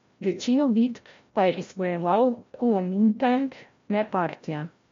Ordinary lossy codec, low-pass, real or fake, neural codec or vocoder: MP3, 48 kbps; 7.2 kHz; fake; codec, 16 kHz, 0.5 kbps, FreqCodec, larger model